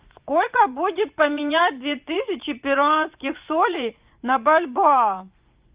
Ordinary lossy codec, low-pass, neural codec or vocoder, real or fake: Opus, 24 kbps; 3.6 kHz; vocoder, 24 kHz, 100 mel bands, Vocos; fake